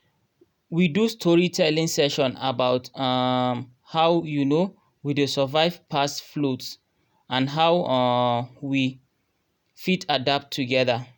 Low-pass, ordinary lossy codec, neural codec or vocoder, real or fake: none; none; none; real